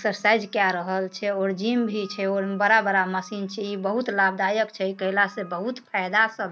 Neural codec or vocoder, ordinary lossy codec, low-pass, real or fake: none; none; none; real